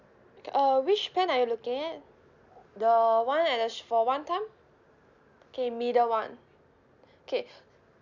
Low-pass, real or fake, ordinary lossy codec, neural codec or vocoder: 7.2 kHz; real; none; none